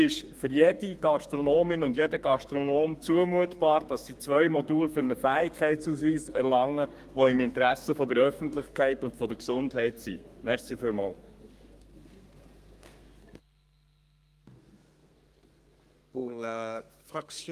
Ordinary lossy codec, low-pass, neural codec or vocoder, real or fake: Opus, 32 kbps; 14.4 kHz; codec, 32 kHz, 1.9 kbps, SNAC; fake